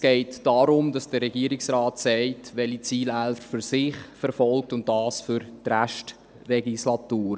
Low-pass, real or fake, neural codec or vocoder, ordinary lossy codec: none; real; none; none